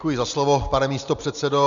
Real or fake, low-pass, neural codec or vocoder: real; 7.2 kHz; none